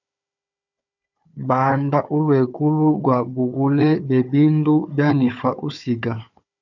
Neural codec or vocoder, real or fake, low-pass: codec, 16 kHz, 4 kbps, FunCodec, trained on Chinese and English, 50 frames a second; fake; 7.2 kHz